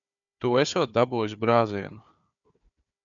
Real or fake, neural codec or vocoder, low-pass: fake; codec, 16 kHz, 4 kbps, FunCodec, trained on Chinese and English, 50 frames a second; 7.2 kHz